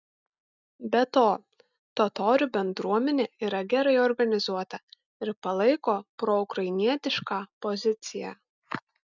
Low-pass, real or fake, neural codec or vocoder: 7.2 kHz; real; none